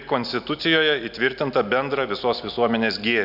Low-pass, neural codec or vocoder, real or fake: 5.4 kHz; none; real